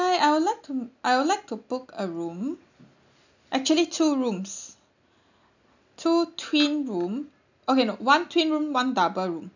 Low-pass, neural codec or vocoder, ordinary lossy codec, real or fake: 7.2 kHz; none; none; real